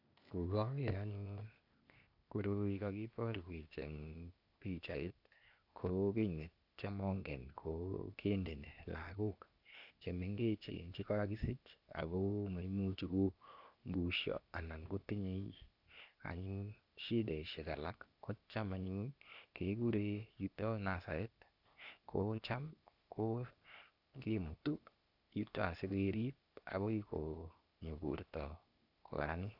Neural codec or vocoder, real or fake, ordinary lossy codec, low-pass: codec, 16 kHz, 0.8 kbps, ZipCodec; fake; none; 5.4 kHz